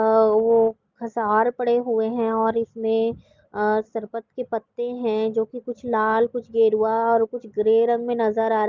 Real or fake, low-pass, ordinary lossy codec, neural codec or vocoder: real; 7.2 kHz; Opus, 32 kbps; none